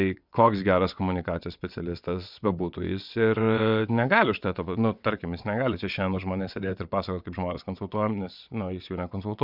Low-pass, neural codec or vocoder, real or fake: 5.4 kHz; vocoder, 22.05 kHz, 80 mel bands, WaveNeXt; fake